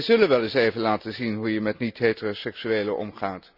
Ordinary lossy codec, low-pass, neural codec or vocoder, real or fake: none; 5.4 kHz; none; real